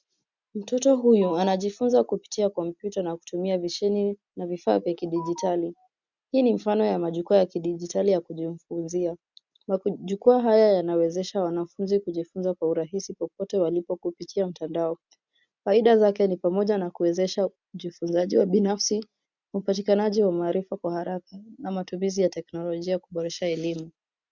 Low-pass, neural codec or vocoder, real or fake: 7.2 kHz; none; real